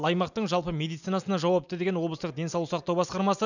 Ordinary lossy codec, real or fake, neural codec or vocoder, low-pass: none; real; none; 7.2 kHz